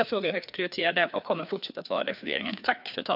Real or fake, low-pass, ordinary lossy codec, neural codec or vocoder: fake; 5.4 kHz; none; codec, 16 kHz, 2 kbps, FreqCodec, larger model